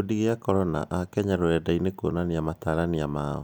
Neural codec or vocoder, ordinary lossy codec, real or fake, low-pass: none; none; real; none